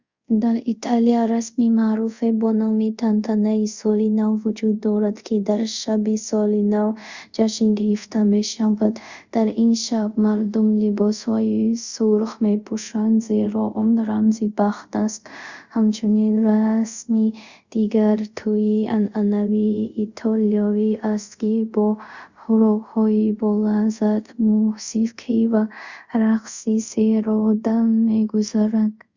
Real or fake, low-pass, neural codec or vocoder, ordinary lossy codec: fake; 7.2 kHz; codec, 24 kHz, 0.5 kbps, DualCodec; Opus, 64 kbps